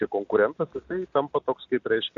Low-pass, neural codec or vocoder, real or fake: 7.2 kHz; none; real